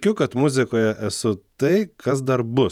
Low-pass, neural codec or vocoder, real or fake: 19.8 kHz; vocoder, 48 kHz, 128 mel bands, Vocos; fake